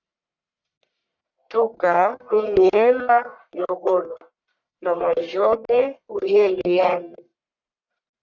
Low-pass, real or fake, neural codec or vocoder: 7.2 kHz; fake; codec, 44.1 kHz, 1.7 kbps, Pupu-Codec